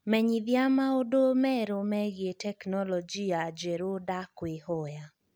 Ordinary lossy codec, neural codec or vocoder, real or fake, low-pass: none; none; real; none